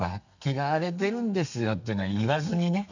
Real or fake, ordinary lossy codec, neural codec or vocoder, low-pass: fake; none; codec, 32 kHz, 1.9 kbps, SNAC; 7.2 kHz